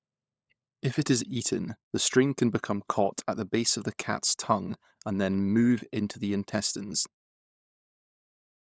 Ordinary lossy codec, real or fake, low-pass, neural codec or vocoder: none; fake; none; codec, 16 kHz, 16 kbps, FunCodec, trained on LibriTTS, 50 frames a second